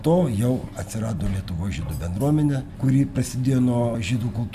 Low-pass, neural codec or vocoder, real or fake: 14.4 kHz; none; real